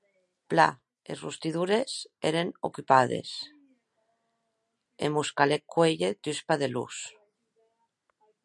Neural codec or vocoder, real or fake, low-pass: none; real; 10.8 kHz